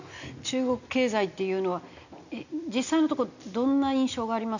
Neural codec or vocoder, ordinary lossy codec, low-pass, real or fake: none; none; 7.2 kHz; real